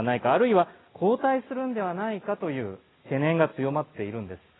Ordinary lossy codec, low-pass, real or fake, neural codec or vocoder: AAC, 16 kbps; 7.2 kHz; real; none